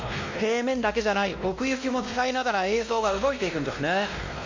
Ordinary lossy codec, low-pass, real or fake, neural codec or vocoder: MP3, 48 kbps; 7.2 kHz; fake; codec, 16 kHz, 1 kbps, X-Codec, WavLM features, trained on Multilingual LibriSpeech